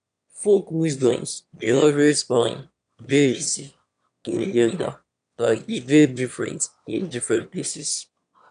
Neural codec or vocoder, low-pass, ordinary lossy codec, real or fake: autoencoder, 22.05 kHz, a latent of 192 numbers a frame, VITS, trained on one speaker; 9.9 kHz; none; fake